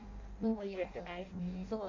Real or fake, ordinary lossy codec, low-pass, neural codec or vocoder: fake; MP3, 48 kbps; 7.2 kHz; codec, 16 kHz in and 24 kHz out, 0.6 kbps, FireRedTTS-2 codec